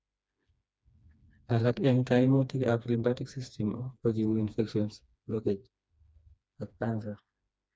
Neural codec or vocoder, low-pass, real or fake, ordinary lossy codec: codec, 16 kHz, 2 kbps, FreqCodec, smaller model; none; fake; none